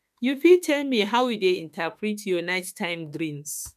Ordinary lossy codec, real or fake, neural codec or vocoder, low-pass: none; fake; autoencoder, 48 kHz, 32 numbers a frame, DAC-VAE, trained on Japanese speech; 14.4 kHz